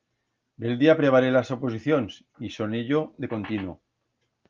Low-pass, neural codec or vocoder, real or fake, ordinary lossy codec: 7.2 kHz; none; real; Opus, 24 kbps